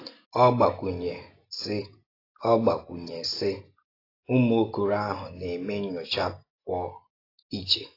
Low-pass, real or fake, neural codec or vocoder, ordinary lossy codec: 5.4 kHz; real; none; AAC, 24 kbps